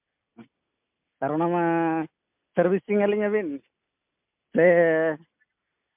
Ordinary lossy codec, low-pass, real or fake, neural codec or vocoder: MP3, 32 kbps; 3.6 kHz; real; none